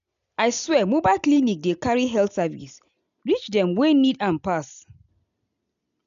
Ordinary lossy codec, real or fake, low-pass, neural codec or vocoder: MP3, 96 kbps; real; 7.2 kHz; none